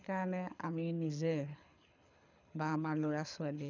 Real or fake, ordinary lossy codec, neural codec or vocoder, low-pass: fake; none; codec, 24 kHz, 6 kbps, HILCodec; 7.2 kHz